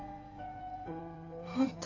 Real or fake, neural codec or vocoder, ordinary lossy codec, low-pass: fake; codec, 16 kHz in and 24 kHz out, 2.2 kbps, FireRedTTS-2 codec; AAC, 48 kbps; 7.2 kHz